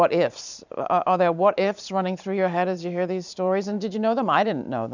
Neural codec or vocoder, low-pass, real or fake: vocoder, 44.1 kHz, 128 mel bands every 512 samples, BigVGAN v2; 7.2 kHz; fake